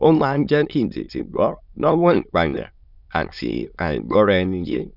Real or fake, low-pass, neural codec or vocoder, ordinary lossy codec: fake; 5.4 kHz; autoencoder, 22.05 kHz, a latent of 192 numbers a frame, VITS, trained on many speakers; none